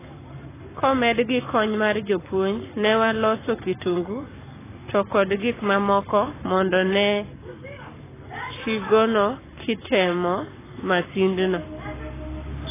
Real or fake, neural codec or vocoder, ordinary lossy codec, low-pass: fake; codec, 44.1 kHz, 7.8 kbps, Pupu-Codec; AAC, 16 kbps; 3.6 kHz